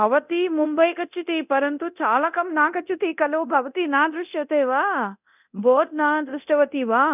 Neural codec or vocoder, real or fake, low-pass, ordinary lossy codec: codec, 24 kHz, 0.9 kbps, DualCodec; fake; 3.6 kHz; none